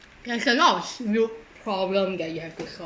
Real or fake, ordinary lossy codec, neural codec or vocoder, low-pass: real; none; none; none